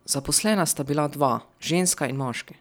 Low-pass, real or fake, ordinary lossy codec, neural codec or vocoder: none; real; none; none